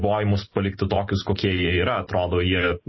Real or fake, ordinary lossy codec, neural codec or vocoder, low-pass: real; MP3, 24 kbps; none; 7.2 kHz